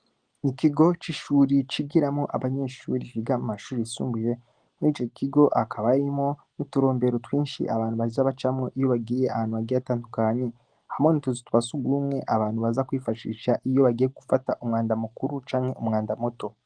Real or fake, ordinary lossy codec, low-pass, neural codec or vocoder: real; Opus, 24 kbps; 9.9 kHz; none